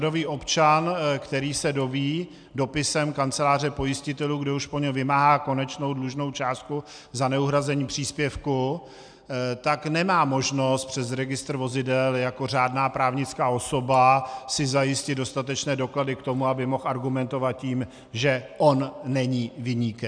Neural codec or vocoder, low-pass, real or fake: none; 9.9 kHz; real